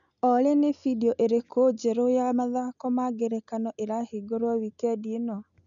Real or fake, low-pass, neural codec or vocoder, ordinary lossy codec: real; 7.2 kHz; none; none